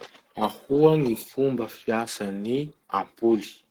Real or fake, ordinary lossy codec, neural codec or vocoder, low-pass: fake; Opus, 16 kbps; codec, 44.1 kHz, 7.8 kbps, Pupu-Codec; 19.8 kHz